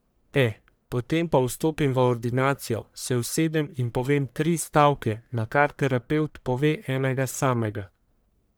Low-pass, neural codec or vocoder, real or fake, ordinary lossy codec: none; codec, 44.1 kHz, 1.7 kbps, Pupu-Codec; fake; none